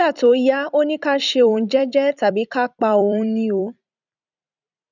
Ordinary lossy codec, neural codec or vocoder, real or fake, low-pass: none; vocoder, 44.1 kHz, 128 mel bands every 256 samples, BigVGAN v2; fake; 7.2 kHz